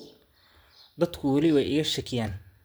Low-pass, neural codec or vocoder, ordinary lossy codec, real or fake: none; none; none; real